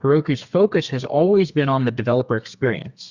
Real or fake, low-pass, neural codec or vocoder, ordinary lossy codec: fake; 7.2 kHz; codec, 32 kHz, 1.9 kbps, SNAC; Opus, 64 kbps